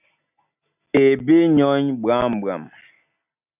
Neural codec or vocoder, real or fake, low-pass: none; real; 3.6 kHz